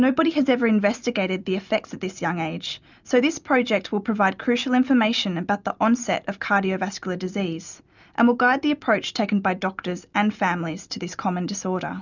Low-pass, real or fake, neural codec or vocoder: 7.2 kHz; real; none